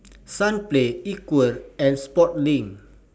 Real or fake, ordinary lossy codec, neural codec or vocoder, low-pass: real; none; none; none